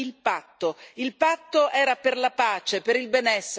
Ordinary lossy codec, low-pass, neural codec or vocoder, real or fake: none; none; none; real